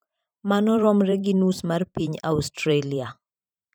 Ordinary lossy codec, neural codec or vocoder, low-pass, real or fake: none; vocoder, 44.1 kHz, 128 mel bands every 512 samples, BigVGAN v2; none; fake